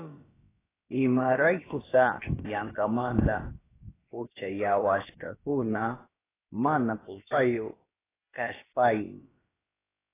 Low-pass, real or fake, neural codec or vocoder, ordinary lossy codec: 3.6 kHz; fake; codec, 16 kHz, about 1 kbps, DyCAST, with the encoder's durations; AAC, 16 kbps